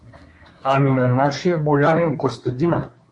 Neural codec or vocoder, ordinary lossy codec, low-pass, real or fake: codec, 24 kHz, 1 kbps, SNAC; MP3, 48 kbps; 10.8 kHz; fake